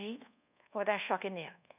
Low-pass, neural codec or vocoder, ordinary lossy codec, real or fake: 3.6 kHz; codec, 24 kHz, 1.2 kbps, DualCodec; none; fake